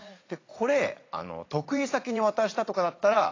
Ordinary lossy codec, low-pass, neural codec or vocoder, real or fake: AAC, 32 kbps; 7.2 kHz; vocoder, 44.1 kHz, 80 mel bands, Vocos; fake